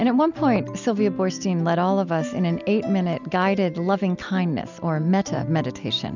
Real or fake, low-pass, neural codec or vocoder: real; 7.2 kHz; none